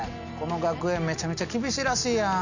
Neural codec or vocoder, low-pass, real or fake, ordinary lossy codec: none; 7.2 kHz; real; none